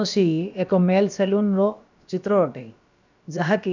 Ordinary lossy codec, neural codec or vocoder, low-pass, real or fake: none; codec, 16 kHz, about 1 kbps, DyCAST, with the encoder's durations; 7.2 kHz; fake